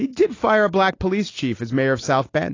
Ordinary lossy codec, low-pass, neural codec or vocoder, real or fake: AAC, 32 kbps; 7.2 kHz; none; real